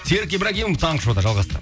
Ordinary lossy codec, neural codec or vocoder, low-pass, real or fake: none; none; none; real